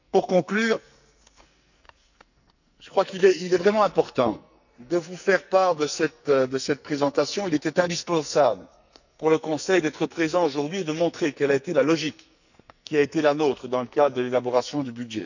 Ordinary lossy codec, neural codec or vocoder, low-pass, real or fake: none; codec, 44.1 kHz, 2.6 kbps, SNAC; 7.2 kHz; fake